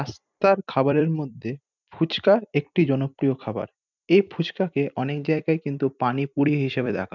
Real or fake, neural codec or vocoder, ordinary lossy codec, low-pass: fake; vocoder, 44.1 kHz, 128 mel bands every 256 samples, BigVGAN v2; none; 7.2 kHz